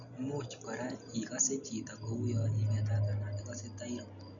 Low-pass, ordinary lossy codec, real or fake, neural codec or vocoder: 7.2 kHz; none; real; none